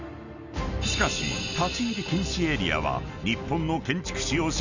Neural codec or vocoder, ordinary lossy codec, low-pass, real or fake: none; none; 7.2 kHz; real